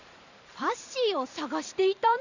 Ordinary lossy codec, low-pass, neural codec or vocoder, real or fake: none; 7.2 kHz; vocoder, 44.1 kHz, 128 mel bands every 512 samples, BigVGAN v2; fake